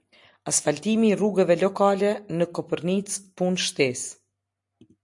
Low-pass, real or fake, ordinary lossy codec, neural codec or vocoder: 10.8 kHz; real; MP3, 64 kbps; none